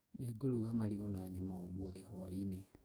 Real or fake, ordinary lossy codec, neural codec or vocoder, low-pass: fake; none; codec, 44.1 kHz, 2.6 kbps, DAC; none